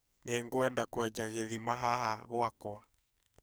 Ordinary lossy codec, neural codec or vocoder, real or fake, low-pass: none; codec, 44.1 kHz, 2.6 kbps, SNAC; fake; none